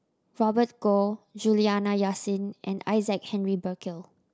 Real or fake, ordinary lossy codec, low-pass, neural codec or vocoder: real; none; none; none